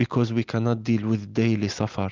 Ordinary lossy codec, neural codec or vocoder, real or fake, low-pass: Opus, 32 kbps; none; real; 7.2 kHz